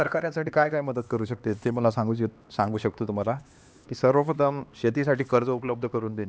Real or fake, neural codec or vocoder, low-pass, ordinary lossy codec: fake; codec, 16 kHz, 2 kbps, X-Codec, HuBERT features, trained on LibriSpeech; none; none